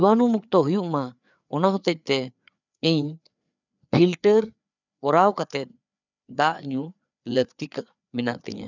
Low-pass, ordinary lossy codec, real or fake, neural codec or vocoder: 7.2 kHz; none; fake; codec, 16 kHz, 4 kbps, FreqCodec, larger model